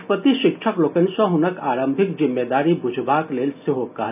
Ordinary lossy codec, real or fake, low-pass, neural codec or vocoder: none; real; 3.6 kHz; none